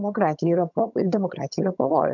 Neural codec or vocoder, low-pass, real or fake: vocoder, 22.05 kHz, 80 mel bands, HiFi-GAN; 7.2 kHz; fake